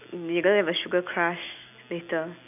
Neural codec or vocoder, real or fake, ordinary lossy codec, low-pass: none; real; none; 3.6 kHz